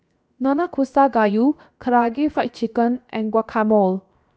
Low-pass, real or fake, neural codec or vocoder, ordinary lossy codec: none; fake; codec, 16 kHz, 0.7 kbps, FocalCodec; none